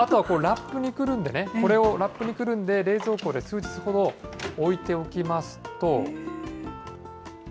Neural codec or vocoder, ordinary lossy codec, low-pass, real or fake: none; none; none; real